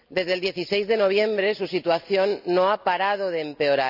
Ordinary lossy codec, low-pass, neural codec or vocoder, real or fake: none; 5.4 kHz; none; real